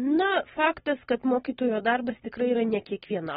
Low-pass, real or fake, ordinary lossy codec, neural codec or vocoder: 19.8 kHz; real; AAC, 16 kbps; none